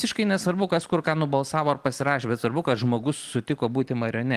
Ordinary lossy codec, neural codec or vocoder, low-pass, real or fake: Opus, 24 kbps; none; 14.4 kHz; real